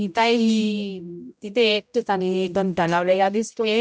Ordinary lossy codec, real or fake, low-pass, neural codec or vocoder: none; fake; none; codec, 16 kHz, 0.5 kbps, X-Codec, HuBERT features, trained on general audio